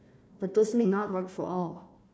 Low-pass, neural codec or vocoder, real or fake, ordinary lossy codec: none; codec, 16 kHz, 1 kbps, FunCodec, trained on Chinese and English, 50 frames a second; fake; none